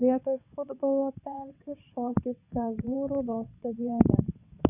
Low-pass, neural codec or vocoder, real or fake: 3.6 kHz; vocoder, 22.05 kHz, 80 mel bands, WaveNeXt; fake